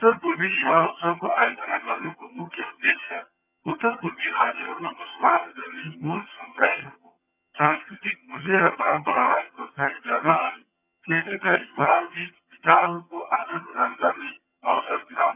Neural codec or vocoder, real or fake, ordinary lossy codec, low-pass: vocoder, 22.05 kHz, 80 mel bands, HiFi-GAN; fake; none; 3.6 kHz